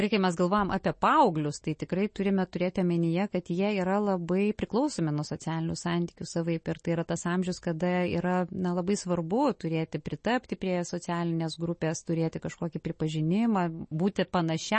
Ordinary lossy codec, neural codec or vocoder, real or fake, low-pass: MP3, 32 kbps; none; real; 10.8 kHz